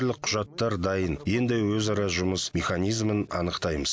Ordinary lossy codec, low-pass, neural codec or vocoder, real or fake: none; none; none; real